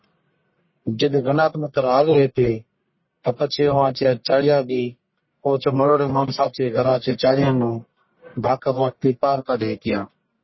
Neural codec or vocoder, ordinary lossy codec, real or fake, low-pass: codec, 44.1 kHz, 1.7 kbps, Pupu-Codec; MP3, 24 kbps; fake; 7.2 kHz